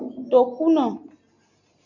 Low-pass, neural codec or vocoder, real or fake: 7.2 kHz; none; real